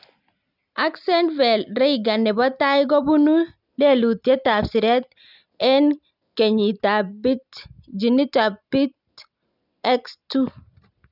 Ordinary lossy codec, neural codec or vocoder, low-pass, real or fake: none; none; 5.4 kHz; real